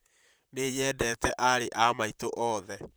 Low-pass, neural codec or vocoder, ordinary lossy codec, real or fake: none; vocoder, 44.1 kHz, 128 mel bands, Pupu-Vocoder; none; fake